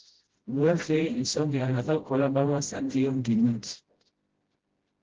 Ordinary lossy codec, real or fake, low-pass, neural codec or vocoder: Opus, 16 kbps; fake; 7.2 kHz; codec, 16 kHz, 0.5 kbps, FreqCodec, smaller model